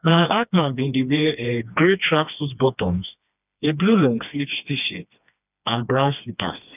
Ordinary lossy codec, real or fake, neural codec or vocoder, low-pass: none; fake; codec, 16 kHz, 2 kbps, FreqCodec, smaller model; 3.6 kHz